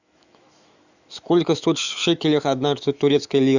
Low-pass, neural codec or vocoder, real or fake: 7.2 kHz; autoencoder, 48 kHz, 128 numbers a frame, DAC-VAE, trained on Japanese speech; fake